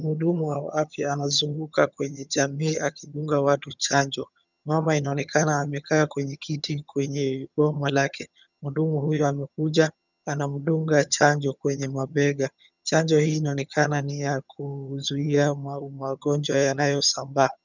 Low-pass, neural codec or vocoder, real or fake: 7.2 kHz; vocoder, 22.05 kHz, 80 mel bands, HiFi-GAN; fake